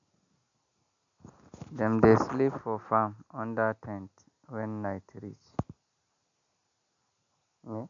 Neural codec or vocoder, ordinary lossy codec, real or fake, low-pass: none; MP3, 96 kbps; real; 7.2 kHz